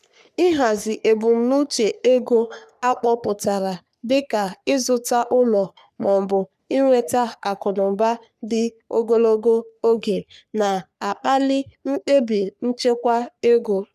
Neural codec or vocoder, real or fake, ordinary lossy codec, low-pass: codec, 44.1 kHz, 3.4 kbps, Pupu-Codec; fake; none; 14.4 kHz